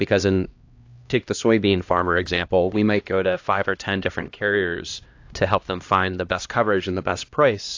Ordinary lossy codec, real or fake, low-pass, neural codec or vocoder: AAC, 48 kbps; fake; 7.2 kHz; codec, 16 kHz, 1 kbps, X-Codec, HuBERT features, trained on LibriSpeech